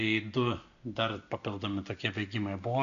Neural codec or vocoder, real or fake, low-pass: none; real; 7.2 kHz